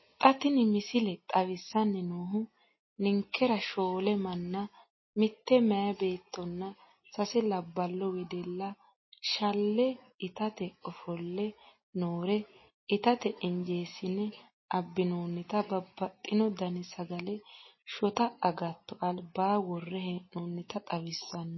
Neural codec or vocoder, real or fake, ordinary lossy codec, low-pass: none; real; MP3, 24 kbps; 7.2 kHz